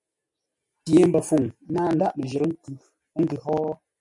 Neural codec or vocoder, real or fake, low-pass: none; real; 10.8 kHz